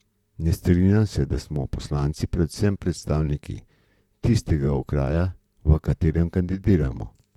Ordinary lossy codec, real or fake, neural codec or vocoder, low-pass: none; fake; vocoder, 44.1 kHz, 128 mel bands, Pupu-Vocoder; 19.8 kHz